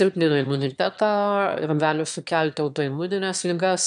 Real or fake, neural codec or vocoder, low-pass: fake; autoencoder, 22.05 kHz, a latent of 192 numbers a frame, VITS, trained on one speaker; 9.9 kHz